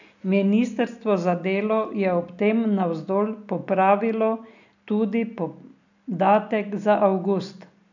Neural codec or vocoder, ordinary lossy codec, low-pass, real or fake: none; none; 7.2 kHz; real